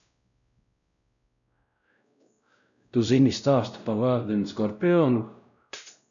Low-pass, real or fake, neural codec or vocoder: 7.2 kHz; fake; codec, 16 kHz, 0.5 kbps, X-Codec, WavLM features, trained on Multilingual LibriSpeech